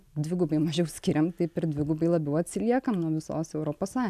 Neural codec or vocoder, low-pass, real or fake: none; 14.4 kHz; real